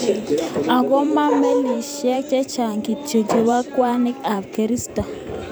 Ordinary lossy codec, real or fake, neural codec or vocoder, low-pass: none; fake; vocoder, 44.1 kHz, 128 mel bands every 512 samples, BigVGAN v2; none